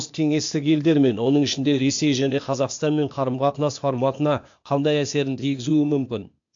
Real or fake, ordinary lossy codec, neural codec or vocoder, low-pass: fake; AAC, 64 kbps; codec, 16 kHz, 0.8 kbps, ZipCodec; 7.2 kHz